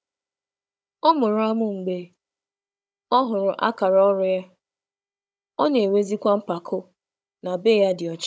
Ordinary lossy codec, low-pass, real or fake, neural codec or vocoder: none; none; fake; codec, 16 kHz, 16 kbps, FunCodec, trained on Chinese and English, 50 frames a second